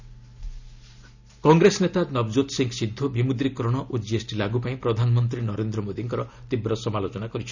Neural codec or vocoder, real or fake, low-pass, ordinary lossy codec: none; real; 7.2 kHz; none